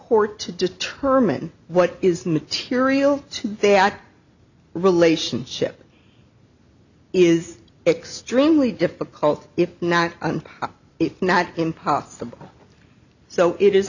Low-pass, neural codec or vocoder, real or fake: 7.2 kHz; none; real